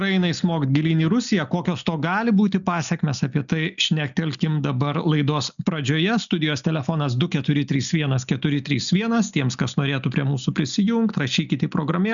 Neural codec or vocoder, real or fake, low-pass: none; real; 7.2 kHz